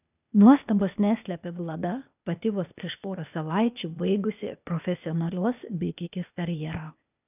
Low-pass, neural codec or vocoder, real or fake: 3.6 kHz; codec, 16 kHz, 0.8 kbps, ZipCodec; fake